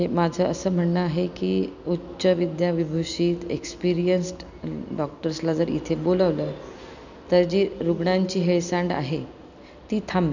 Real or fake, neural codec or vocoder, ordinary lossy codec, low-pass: real; none; none; 7.2 kHz